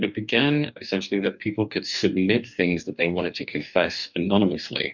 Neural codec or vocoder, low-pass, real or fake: codec, 44.1 kHz, 2.6 kbps, DAC; 7.2 kHz; fake